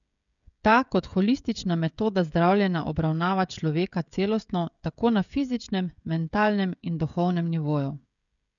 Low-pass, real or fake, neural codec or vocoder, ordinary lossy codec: 7.2 kHz; fake; codec, 16 kHz, 16 kbps, FreqCodec, smaller model; none